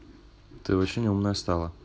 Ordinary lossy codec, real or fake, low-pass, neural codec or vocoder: none; real; none; none